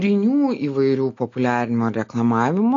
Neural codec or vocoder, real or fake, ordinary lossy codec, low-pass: none; real; AAC, 48 kbps; 7.2 kHz